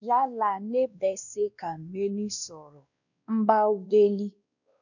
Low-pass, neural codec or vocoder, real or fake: 7.2 kHz; codec, 16 kHz, 1 kbps, X-Codec, WavLM features, trained on Multilingual LibriSpeech; fake